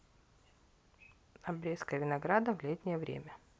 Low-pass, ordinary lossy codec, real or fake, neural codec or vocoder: none; none; real; none